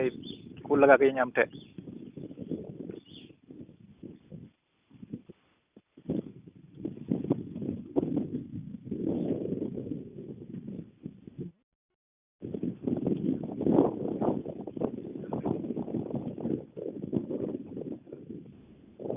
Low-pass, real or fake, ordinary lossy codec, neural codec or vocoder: 3.6 kHz; real; Opus, 64 kbps; none